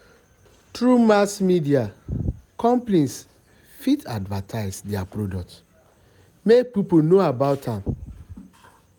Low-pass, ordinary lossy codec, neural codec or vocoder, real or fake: none; none; none; real